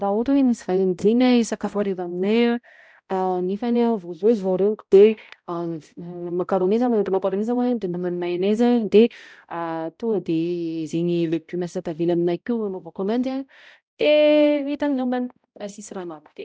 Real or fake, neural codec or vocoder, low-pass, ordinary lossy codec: fake; codec, 16 kHz, 0.5 kbps, X-Codec, HuBERT features, trained on balanced general audio; none; none